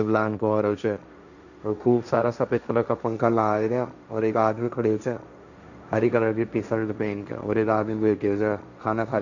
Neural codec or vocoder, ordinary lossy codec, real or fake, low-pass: codec, 16 kHz, 1.1 kbps, Voila-Tokenizer; none; fake; 7.2 kHz